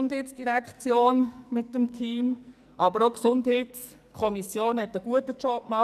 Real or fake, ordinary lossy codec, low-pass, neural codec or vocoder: fake; none; 14.4 kHz; codec, 44.1 kHz, 2.6 kbps, SNAC